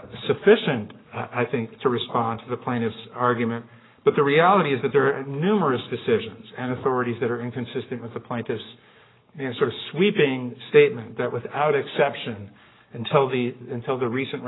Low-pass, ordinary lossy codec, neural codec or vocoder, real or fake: 7.2 kHz; AAC, 16 kbps; codec, 44.1 kHz, 7.8 kbps, Pupu-Codec; fake